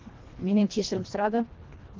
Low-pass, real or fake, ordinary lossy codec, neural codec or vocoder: 7.2 kHz; fake; Opus, 16 kbps; codec, 24 kHz, 1.5 kbps, HILCodec